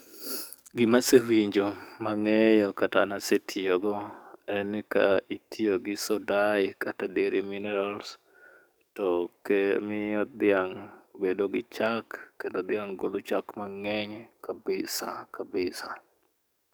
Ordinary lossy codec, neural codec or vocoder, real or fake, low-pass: none; codec, 44.1 kHz, 7.8 kbps, DAC; fake; none